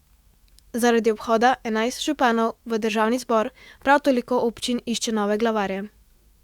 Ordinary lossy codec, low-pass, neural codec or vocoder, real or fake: Opus, 64 kbps; 19.8 kHz; autoencoder, 48 kHz, 128 numbers a frame, DAC-VAE, trained on Japanese speech; fake